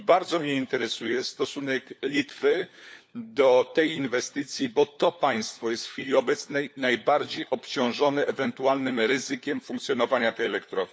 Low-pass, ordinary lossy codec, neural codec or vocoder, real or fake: none; none; codec, 16 kHz, 4 kbps, FunCodec, trained on LibriTTS, 50 frames a second; fake